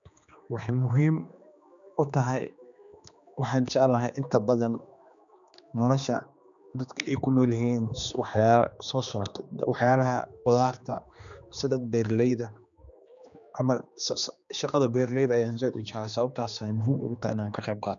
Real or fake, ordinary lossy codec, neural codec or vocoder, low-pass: fake; none; codec, 16 kHz, 2 kbps, X-Codec, HuBERT features, trained on general audio; 7.2 kHz